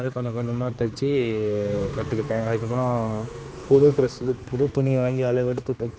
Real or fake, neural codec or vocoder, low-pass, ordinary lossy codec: fake; codec, 16 kHz, 2 kbps, X-Codec, HuBERT features, trained on general audio; none; none